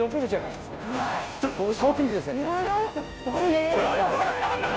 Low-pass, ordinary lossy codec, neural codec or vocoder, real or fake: none; none; codec, 16 kHz, 0.5 kbps, FunCodec, trained on Chinese and English, 25 frames a second; fake